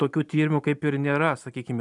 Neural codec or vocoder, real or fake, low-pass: none; real; 10.8 kHz